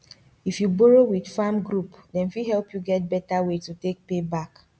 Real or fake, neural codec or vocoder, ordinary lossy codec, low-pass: real; none; none; none